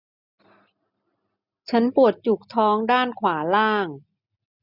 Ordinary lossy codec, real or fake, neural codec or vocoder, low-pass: none; real; none; 5.4 kHz